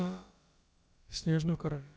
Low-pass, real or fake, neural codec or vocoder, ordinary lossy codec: none; fake; codec, 16 kHz, about 1 kbps, DyCAST, with the encoder's durations; none